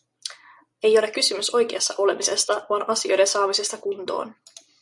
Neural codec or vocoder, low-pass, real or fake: none; 10.8 kHz; real